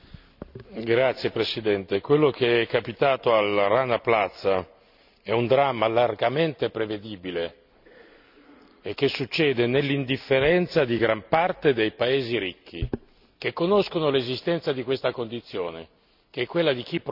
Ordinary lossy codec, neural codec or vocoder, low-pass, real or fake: none; none; 5.4 kHz; real